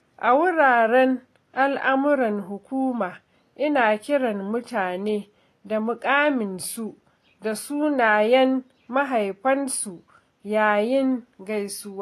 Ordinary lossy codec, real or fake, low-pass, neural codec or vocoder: AAC, 48 kbps; real; 14.4 kHz; none